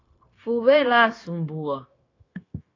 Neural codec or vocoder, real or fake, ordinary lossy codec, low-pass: codec, 16 kHz, 0.9 kbps, LongCat-Audio-Codec; fake; AAC, 32 kbps; 7.2 kHz